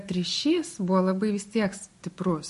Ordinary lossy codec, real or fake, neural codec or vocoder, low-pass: MP3, 48 kbps; real; none; 14.4 kHz